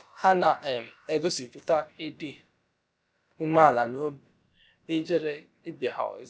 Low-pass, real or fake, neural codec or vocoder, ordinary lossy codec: none; fake; codec, 16 kHz, about 1 kbps, DyCAST, with the encoder's durations; none